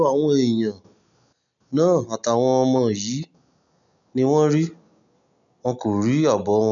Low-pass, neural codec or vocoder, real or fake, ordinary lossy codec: 7.2 kHz; none; real; none